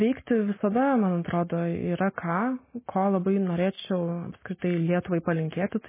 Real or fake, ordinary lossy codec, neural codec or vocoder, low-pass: real; MP3, 16 kbps; none; 3.6 kHz